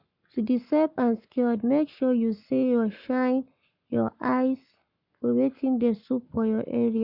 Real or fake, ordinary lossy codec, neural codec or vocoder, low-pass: fake; none; codec, 44.1 kHz, 7.8 kbps, Pupu-Codec; 5.4 kHz